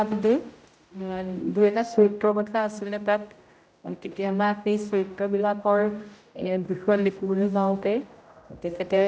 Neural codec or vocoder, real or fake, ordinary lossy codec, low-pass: codec, 16 kHz, 0.5 kbps, X-Codec, HuBERT features, trained on general audio; fake; none; none